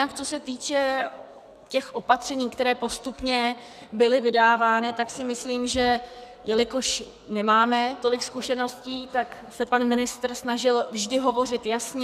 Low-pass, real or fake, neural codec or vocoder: 14.4 kHz; fake; codec, 44.1 kHz, 2.6 kbps, SNAC